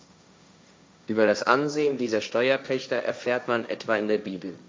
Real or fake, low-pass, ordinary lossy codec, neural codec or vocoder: fake; none; none; codec, 16 kHz, 1.1 kbps, Voila-Tokenizer